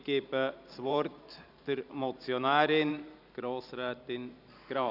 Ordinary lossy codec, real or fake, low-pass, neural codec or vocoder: none; fake; 5.4 kHz; vocoder, 44.1 kHz, 128 mel bands every 512 samples, BigVGAN v2